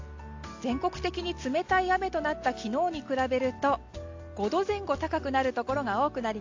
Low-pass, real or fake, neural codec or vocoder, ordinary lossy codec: 7.2 kHz; real; none; AAC, 48 kbps